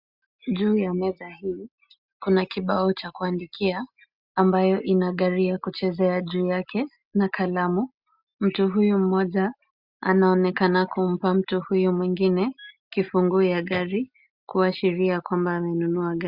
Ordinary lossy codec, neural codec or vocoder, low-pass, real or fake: Opus, 64 kbps; none; 5.4 kHz; real